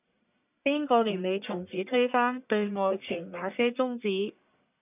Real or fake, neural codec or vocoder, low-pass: fake; codec, 44.1 kHz, 1.7 kbps, Pupu-Codec; 3.6 kHz